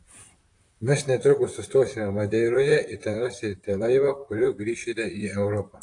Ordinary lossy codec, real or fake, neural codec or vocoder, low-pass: AAC, 48 kbps; fake; vocoder, 44.1 kHz, 128 mel bands, Pupu-Vocoder; 10.8 kHz